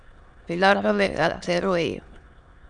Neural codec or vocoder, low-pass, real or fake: autoencoder, 22.05 kHz, a latent of 192 numbers a frame, VITS, trained on many speakers; 9.9 kHz; fake